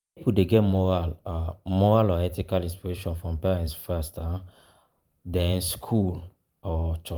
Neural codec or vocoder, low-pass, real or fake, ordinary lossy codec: vocoder, 44.1 kHz, 128 mel bands every 512 samples, BigVGAN v2; 19.8 kHz; fake; Opus, 32 kbps